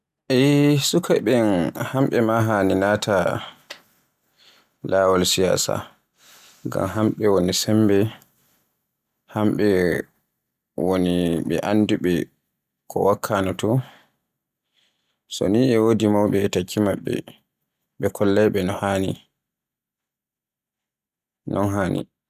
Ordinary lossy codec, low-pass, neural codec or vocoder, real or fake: none; 14.4 kHz; none; real